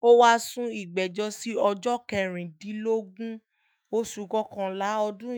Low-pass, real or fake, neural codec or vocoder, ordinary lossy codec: none; fake; autoencoder, 48 kHz, 128 numbers a frame, DAC-VAE, trained on Japanese speech; none